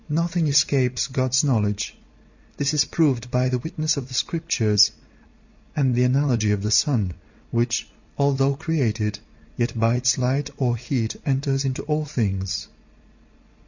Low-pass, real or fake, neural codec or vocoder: 7.2 kHz; real; none